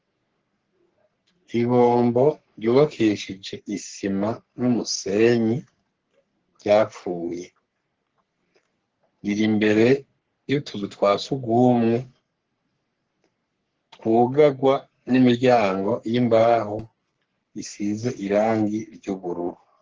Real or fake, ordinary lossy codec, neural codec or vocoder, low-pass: fake; Opus, 16 kbps; codec, 44.1 kHz, 3.4 kbps, Pupu-Codec; 7.2 kHz